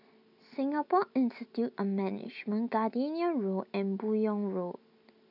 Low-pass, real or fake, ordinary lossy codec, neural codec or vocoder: 5.4 kHz; real; none; none